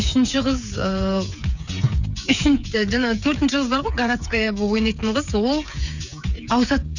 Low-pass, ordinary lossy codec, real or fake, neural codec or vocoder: 7.2 kHz; none; fake; codec, 16 kHz, 8 kbps, FreqCodec, smaller model